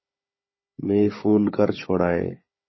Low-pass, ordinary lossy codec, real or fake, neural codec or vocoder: 7.2 kHz; MP3, 24 kbps; fake; codec, 16 kHz, 16 kbps, FunCodec, trained on Chinese and English, 50 frames a second